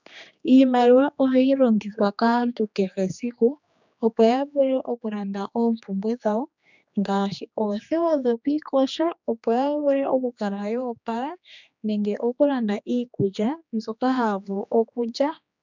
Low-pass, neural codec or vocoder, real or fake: 7.2 kHz; codec, 16 kHz, 2 kbps, X-Codec, HuBERT features, trained on general audio; fake